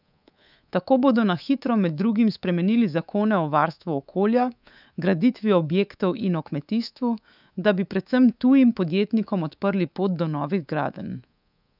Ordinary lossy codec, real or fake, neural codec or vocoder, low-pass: none; fake; codec, 24 kHz, 3.1 kbps, DualCodec; 5.4 kHz